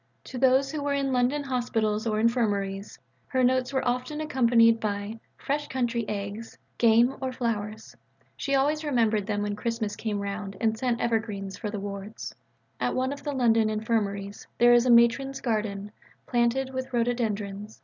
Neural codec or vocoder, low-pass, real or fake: none; 7.2 kHz; real